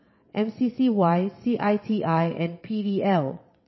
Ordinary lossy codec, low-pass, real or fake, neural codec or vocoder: MP3, 24 kbps; 7.2 kHz; fake; vocoder, 22.05 kHz, 80 mel bands, WaveNeXt